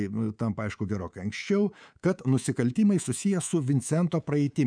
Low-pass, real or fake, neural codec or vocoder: 9.9 kHz; fake; codec, 24 kHz, 3.1 kbps, DualCodec